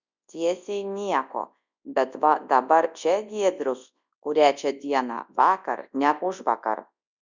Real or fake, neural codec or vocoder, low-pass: fake; codec, 24 kHz, 0.9 kbps, WavTokenizer, large speech release; 7.2 kHz